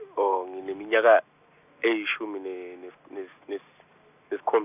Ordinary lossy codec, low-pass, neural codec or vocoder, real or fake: none; 3.6 kHz; none; real